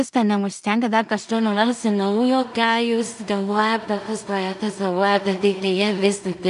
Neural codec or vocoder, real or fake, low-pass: codec, 16 kHz in and 24 kHz out, 0.4 kbps, LongCat-Audio-Codec, two codebook decoder; fake; 10.8 kHz